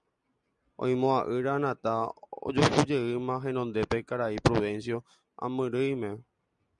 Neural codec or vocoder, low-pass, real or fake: none; 10.8 kHz; real